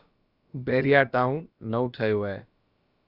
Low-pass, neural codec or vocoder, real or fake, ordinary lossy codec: 5.4 kHz; codec, 16 kHz, about 1 kbps, DyCAST, with the encoder's durations; fake; Opus, 64 kbps